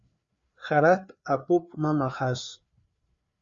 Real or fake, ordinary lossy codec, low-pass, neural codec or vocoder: fake; Opus, 64 kbps; 7.2 kHz; codec, 16 kHz, 4 kbps, FreqCodec, larger model